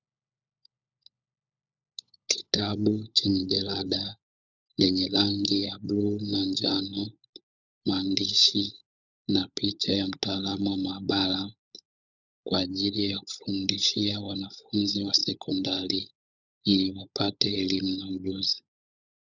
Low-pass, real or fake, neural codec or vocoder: 7.2 kHz; fake; codec, 16 kHz, 16 kbps, FunCodec, trained on LibriTTS, 50 frames a second